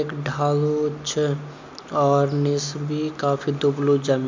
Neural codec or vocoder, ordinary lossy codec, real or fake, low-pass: none; MP3, 64 kbps; real; 7.2 kHz